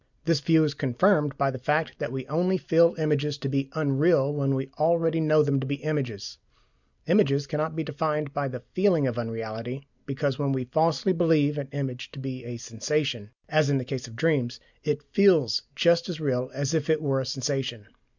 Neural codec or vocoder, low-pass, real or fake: none; 7.2 kHz; real